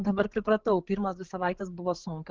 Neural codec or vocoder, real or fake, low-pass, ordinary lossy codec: codec, 16 kHz, 8 kbps, FreqCodec, larger model; fake; 7.2 kHz; Opus, 16 kbps